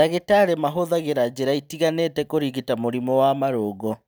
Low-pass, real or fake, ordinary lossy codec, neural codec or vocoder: none; real; none; none